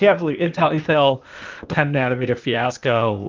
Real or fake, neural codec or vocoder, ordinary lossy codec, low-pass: fake; codec, 16 kHz, 0.8 kbps, ZipCodec; Opus, 24 kbps; 7.2 kHz